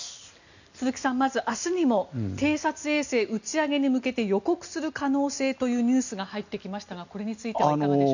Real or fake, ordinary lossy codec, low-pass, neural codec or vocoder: real; none; 7.2 kHz; none